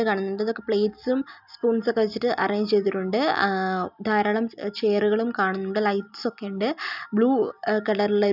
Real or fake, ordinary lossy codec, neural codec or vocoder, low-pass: real; none; none; 5.4 kHz